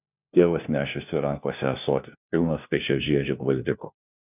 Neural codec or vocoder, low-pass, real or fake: codec, 16 kHz, 1 kbps, FunCodec, trained on LibriTTS, 50 frames a second; 3.6 kHz; fake